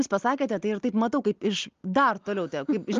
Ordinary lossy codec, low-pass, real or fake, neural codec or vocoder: Opus, 24 kbps; 7.2 kHz; real; none